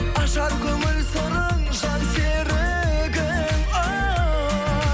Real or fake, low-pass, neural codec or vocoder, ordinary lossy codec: real; none; none; none